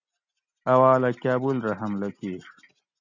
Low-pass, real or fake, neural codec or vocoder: 7.2 kHz; real; none